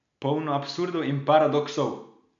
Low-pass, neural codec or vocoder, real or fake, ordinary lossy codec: 7.2 kHz; none; real; MP3, 64 kbps